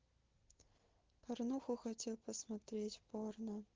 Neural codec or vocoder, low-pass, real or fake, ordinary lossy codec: none; 7.2 kHz; real; Opus, 16 kbps